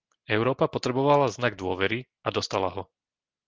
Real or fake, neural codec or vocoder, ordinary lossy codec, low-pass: real; none; Opus, 16 kbps; 7.2 kHz